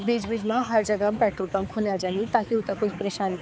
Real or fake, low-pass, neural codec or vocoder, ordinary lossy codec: fake; none; codec, 16 kHz, 4 kbps, X-Codec, HuBERT features, trained on balanced general audio; none